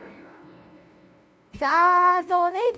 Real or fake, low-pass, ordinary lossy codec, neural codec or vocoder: fake; none; none; codec, 16 kHz, 0.5 kbps, FunCodec, trained on LibriTTS, 25 frames a second